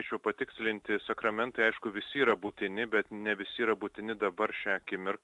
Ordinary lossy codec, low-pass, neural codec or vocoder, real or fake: AAC, 64 kbps; 10.8 kHz; none; real